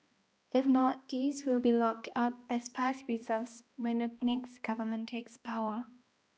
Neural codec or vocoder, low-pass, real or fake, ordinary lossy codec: codec, 16 kHz, 1 kbps, X-Codec, HuBERT features, trained on balanced general audio; none; fake; none